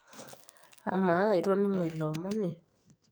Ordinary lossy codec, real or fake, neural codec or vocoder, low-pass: none; fake; codec, 44.1 kHz, 2.6 kbps, SNAC; none